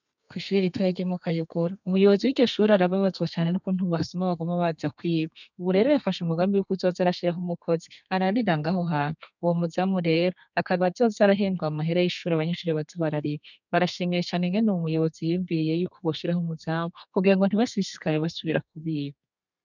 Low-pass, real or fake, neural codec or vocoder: 7.2 kHz; fake; codec, 32 kHz, 1.9 kbps, SNAC